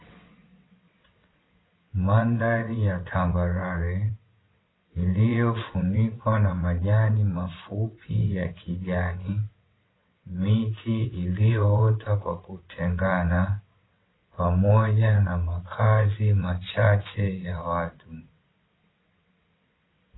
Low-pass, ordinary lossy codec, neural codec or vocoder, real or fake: 7.2 kHz; AAC, 16 kbps; vocoder, 22.05 kHz, 80 mel bands, WaveNeXt; fake